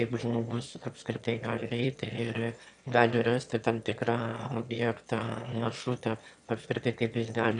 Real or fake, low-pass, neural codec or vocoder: fake; 9.9 kHz; autoencoder, 22.05 kHz, a latent of 192 numbers a frame, VITS, trained on one speaker